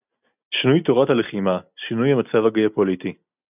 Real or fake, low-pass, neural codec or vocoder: real; 3.6 kHz; none